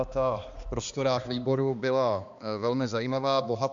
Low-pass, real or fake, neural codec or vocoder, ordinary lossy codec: 7.2 kHz; fake; codec, 16 kHz, 2 kbps, X-Codec, HuBERT features, trained on balanced general audio; Opus, 64 kbps